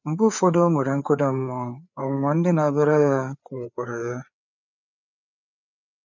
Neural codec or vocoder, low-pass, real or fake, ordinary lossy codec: codec, 16 kHz, 4 kbps, FreqCodec, larger model; 7.2 kHz; fake; none